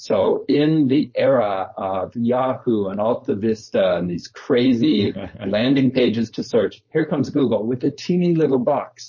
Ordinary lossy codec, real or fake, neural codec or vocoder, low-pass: MP3, 32 kbps; fake; codec, 16 kHz, 4.8 kbps, FACodec; 7.2 kHz